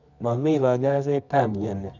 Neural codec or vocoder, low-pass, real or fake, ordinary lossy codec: codec, 24 kHz, 0.9 kbps, WavTokenizer, medium music audio release; 7.2 kHz; fake; none